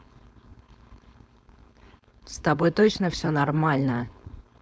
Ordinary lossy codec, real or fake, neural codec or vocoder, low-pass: none; fake; codec, 16 kHz, 4.8 kbps, FACodec; none